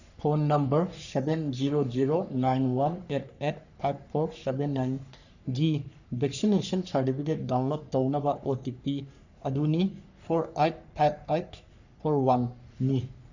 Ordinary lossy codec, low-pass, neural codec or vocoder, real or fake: none; 7.2 kHz; codec, 44.1 kHz, 3.4 kbps, Pupu-Codec; fake